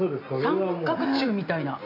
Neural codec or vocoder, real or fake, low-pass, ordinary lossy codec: none; real; 5.4 kHz; MP3, 48 kbps